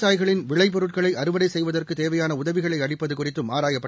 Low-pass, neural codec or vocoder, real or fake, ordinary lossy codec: none; none; real; none